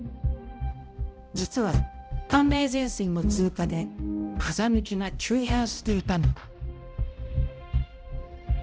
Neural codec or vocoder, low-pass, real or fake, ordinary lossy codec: codec, 16 kHz, 0.5 kbps, X-Codec, HuBERT features, trained on balanced general audio; none; fake; none